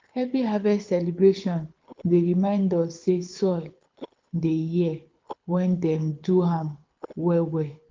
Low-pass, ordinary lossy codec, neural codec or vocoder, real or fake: 7.2 kHz; Opus, 24 kbps; codec, 24 kHz, 6 kbps, HILCodec; fake